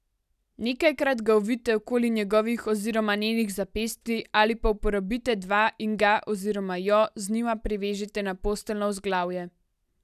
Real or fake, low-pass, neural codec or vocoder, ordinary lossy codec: real; 14.4 kHz; none; none